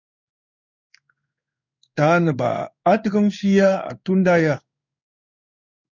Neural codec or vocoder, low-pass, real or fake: codec, 16 kHz in and 24 kHz out, 1 kbps, XY-Tokenizer; 7.2 kHz; fake